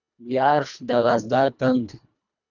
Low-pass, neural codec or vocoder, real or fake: 7.2 kHz; codec, 24 kHz, 1.5 kbps, HILCodec; fake